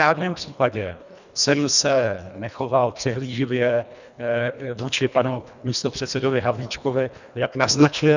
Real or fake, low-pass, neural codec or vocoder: fake; 7.2 kHz; codec, 24 kHz, 1.5 kbps, HILCodec